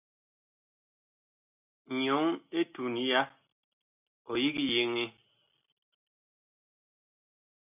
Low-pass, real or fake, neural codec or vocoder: 3.6 kHz; real; none